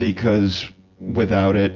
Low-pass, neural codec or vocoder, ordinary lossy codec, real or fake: 7.2 kHz; vocoder, 24 kHz, 100 mel bands, Vocos; Opus, 32 kbps; fake